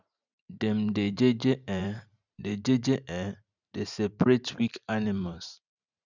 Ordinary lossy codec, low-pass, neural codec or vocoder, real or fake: none; 7.2 kHz; vocoder, 44.1 kHz, 128 mel bands every 512 samples, BigVGAN v2; fake